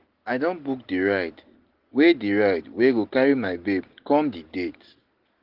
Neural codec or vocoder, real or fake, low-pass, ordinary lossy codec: none; real; 5.4 kHz; Opus, 16 kbps